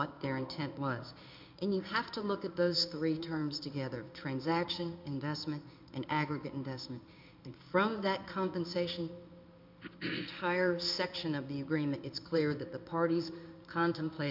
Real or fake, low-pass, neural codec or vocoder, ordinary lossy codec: fake; 5.4 kHz; codec, 16 kHz in and 24 kHz out, 1 kbps, XY-Tokenizer; MP3, 48 kbps